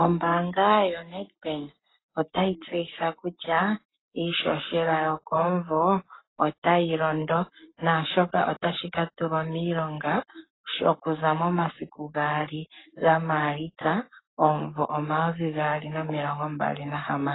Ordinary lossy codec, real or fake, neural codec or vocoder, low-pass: AAC, 16 kbps; fake; codec, 44.1 kHz, 7.8 kbps, Pupu-Codec; 7.2 kHz